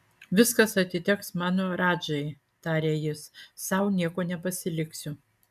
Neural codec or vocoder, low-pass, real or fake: none; 14.4 kHz; real